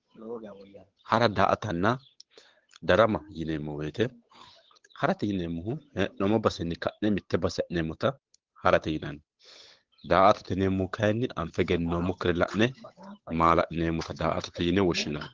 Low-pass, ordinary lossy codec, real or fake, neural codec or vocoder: 7.2 kHz; Opus, 16 kbps; fake; codec, 16 kHz, 8 kbps, FunCodec, trained on Chinese and English, 25 frames a second